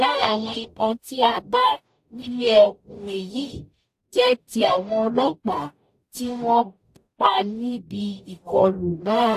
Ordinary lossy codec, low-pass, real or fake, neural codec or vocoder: AAC, 64 kbps; 14.4 kHz; fake; codec, 44.1 kHz, 0.9 kbps, DAC